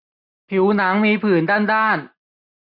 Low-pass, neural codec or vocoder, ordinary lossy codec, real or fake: 5.4 kHz; none; none; real